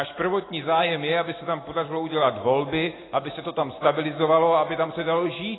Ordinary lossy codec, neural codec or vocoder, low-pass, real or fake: AAC, 16 kbps; none; 7.2 kHz; real